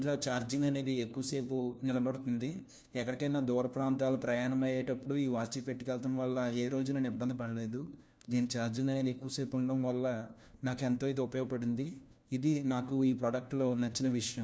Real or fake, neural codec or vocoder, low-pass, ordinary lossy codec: fake; codec, 16 kHz, 1 kbps, FunCodec, trained on LibriTTS, 50 frames a second; none; none